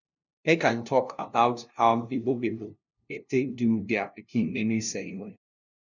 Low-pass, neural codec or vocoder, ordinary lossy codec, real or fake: 7.2 kHz; codec, 16 kHz, 0.5 kbps, FunCodec, trained on LibriTTS, 25 frames a second; none; fake